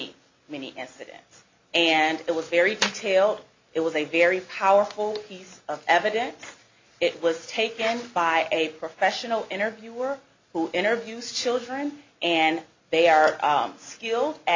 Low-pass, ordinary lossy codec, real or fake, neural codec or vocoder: 7.2 kHz; MP3, 48 kbps; real; none